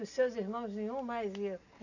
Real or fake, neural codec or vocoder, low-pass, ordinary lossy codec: real; none; 7.2 kHz; none